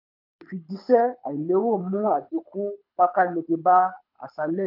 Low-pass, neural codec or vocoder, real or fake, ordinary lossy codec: 5.4 kHz; codec, 24 kHz, 6 kbps, HILCodec; fake; none